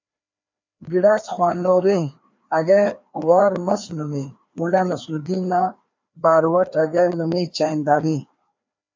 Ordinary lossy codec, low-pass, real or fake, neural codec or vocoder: MP3, 64 kbps; 7.2 kHz; fake; codec, 16 kHz, 2 kbps, FreqCodec, larger model